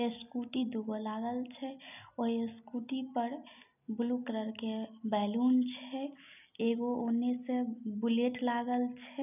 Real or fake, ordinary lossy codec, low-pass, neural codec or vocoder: real; none; 3.6 kHz; none